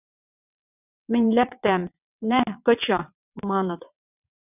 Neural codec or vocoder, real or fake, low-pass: vocoder, 22.05 kHz, 80 mel bands, WaveNeXt; fake; 3.6 kHz